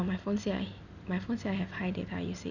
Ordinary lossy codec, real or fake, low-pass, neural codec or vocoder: none; real; 7.2 kHz; none